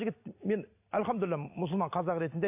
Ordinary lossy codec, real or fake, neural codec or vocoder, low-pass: AAC, 32 kbps; real; none; 3.6 kHz